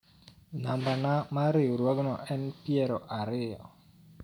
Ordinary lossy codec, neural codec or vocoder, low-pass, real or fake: none; none; 19.8 kHz; real